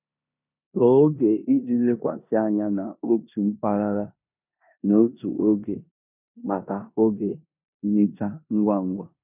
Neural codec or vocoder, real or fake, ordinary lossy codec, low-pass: codec, 16 kHz in and 24 kHz out, 0.9 kbps, LongCat-Audio-Codec, four codebook decoder; fake; none; 3.6 kHz